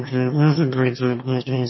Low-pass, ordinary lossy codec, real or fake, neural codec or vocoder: 7.2 kHz; MP3, 24 kbps; fake; autoencoder, 22.05 kHz, a latent of 192 numbers a frame, VITS, trained on one speaker